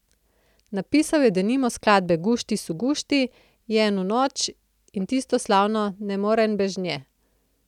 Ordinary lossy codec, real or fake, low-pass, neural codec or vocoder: none; real; 19.8 kHz; none